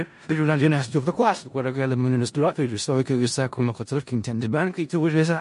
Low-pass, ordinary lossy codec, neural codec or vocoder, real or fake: 10.8 kHz; MP3, 48 kbps; codec, 16 kHz in and 24 kHz out, 0.4 kbps, LongCat-Audio-Codec, four codebook decoder; fake